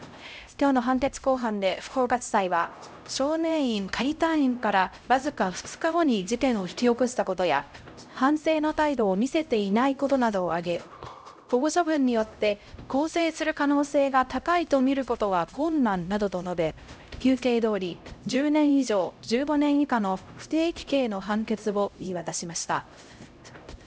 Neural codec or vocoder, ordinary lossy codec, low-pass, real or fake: codec, 16 kHz, 0.5 kbps, X-Codec, HuBERT features, trained on LibriSpeech; none; none; fake